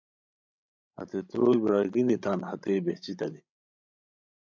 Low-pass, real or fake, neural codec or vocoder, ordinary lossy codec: 7.2 kHz; fake; codec, 16 kHz, 16 kbps, FreqCodec, larger model; MP3, 64 kbps